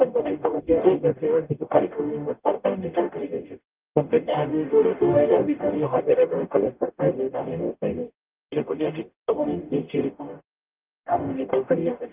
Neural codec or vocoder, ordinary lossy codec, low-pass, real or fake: codec, 44.1 kHz, 0.9 kbps, DAC; Opus, 24 kbps; 3.6 kHz; fake